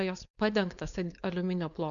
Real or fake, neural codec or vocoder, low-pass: fake; codec, 16 kHz, 4.8 kbps, FACodec; 7.2 kHz